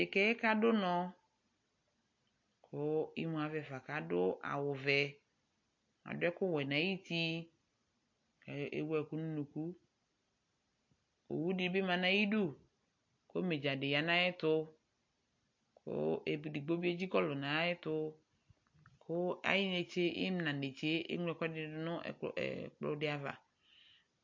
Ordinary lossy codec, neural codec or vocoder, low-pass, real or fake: MP3, 48 kbps; none; 7.2 kHz; real